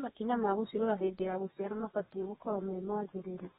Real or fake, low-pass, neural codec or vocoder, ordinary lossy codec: fake; 14.4 kHz; codec, 32 kHz, 1.9 kbps, SNAC; AAC, 16 kbps